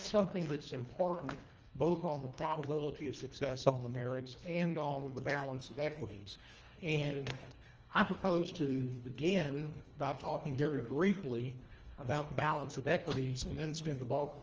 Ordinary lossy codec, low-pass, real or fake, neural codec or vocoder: Opus, 24 kbps; 7.2 kHz; fake; codec, 24 kHz, 1.5 kbps, HILCodec